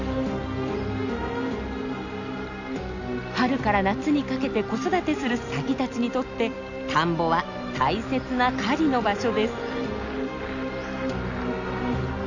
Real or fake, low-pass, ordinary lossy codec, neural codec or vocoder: real; 7.2 kHz; none; none